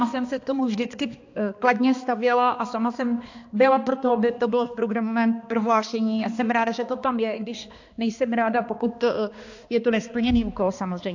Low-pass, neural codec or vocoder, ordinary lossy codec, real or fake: 7.2 kHz; codec, 16 kHz, 2 kbps, X-Codec, HuBERT features, trained on balanced general audio; AAC, 48 kbps; fake